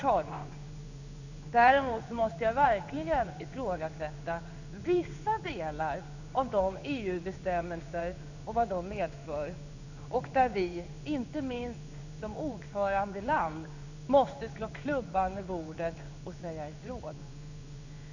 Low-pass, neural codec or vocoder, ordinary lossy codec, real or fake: 7.2 kHz; codec, 16 kHz in and 24 kHz out, 1 kbps, XY-Tokenizer; none; fake